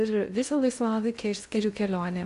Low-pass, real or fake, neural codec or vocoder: 10.8 kHz; fake; codec, 16 kHz in and 24 kHz out, 0.6 kbps, FocalCodec, streaming, 2048 codes